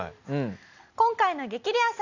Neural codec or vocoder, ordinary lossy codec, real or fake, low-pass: none; none; real; 7.2 kHz